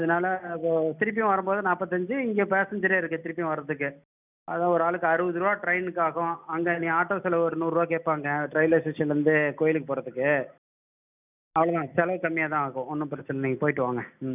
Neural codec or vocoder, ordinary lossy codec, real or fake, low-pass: none; none; real; 3.6 kHz